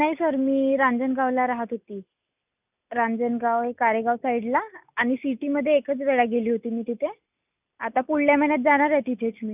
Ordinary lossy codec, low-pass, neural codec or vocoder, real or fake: none; 3.6 kHz; none; real